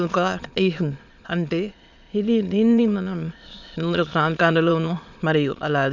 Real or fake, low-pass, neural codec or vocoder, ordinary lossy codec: fake; 7.2 kHz; autoencoder, 22.05 kHz, a latent of 192 numbers a frame, VITS, trained on many speakers; none